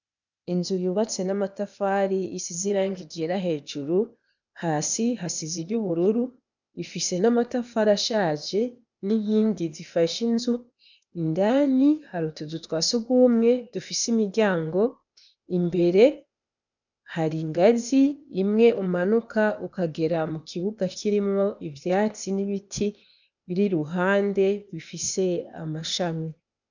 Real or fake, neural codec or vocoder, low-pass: fake; codec, 16 kHz, 0.8 kbps, ZipCodec; 7.2 kHz